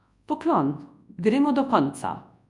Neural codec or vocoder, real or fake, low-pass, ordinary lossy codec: codec, 24 kHz, 0.9 kbps, WavTokenizer, large speech release; fake; 10.8 kHz; MP3, 96 kbps